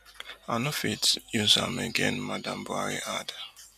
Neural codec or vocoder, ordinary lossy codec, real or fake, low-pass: none; none; real; 14.4 kHz